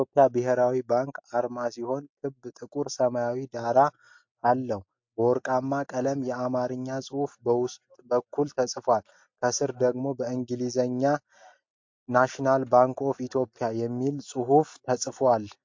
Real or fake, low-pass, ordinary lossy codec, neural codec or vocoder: real; 7.2 kHz; MP3, 48 kbps; none